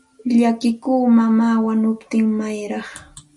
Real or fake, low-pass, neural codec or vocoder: real; 10.8 kHz; none